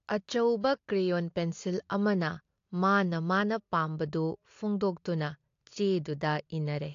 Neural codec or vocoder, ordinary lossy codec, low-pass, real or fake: none; AAC, 48 kbps; 7.2 kHz; real